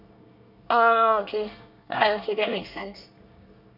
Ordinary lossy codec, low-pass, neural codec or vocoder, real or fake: none; 5.4 kHz; codec, 24 kHz, 1 kbps, SNAC; fake